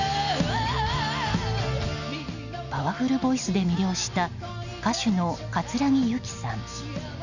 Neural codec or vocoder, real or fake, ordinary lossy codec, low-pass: none; real; none; 7.2 kHz